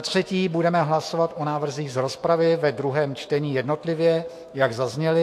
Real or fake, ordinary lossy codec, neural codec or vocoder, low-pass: fake; AAC, 64 kbps; autoencoder, 48 kHz, 128 numbers a frame, DAC-VAE, trained on Japanese speech; 14.4 kHz